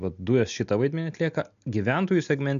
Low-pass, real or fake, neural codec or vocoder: 7.2 kHz; real; none